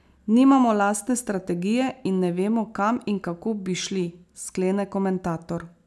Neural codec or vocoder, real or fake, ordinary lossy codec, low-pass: none; real; none; none